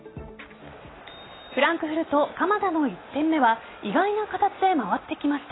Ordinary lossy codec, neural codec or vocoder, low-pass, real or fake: AAC, 16 kbps; none; 7.2 kHz; real